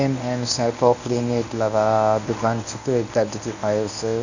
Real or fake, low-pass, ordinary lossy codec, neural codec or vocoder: fake; 7.2 kHz; none; codec, 24 kHz, 0.9 kbps, WavTokenizer, medium speech release version 1